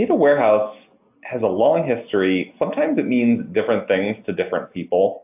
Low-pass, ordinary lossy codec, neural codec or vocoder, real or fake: 3.6 kHz; AAC, 32 kbps; none; real